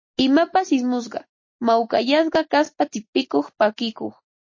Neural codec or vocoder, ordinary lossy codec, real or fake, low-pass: none; MP3, 32 kbps; real; 7.2 kHz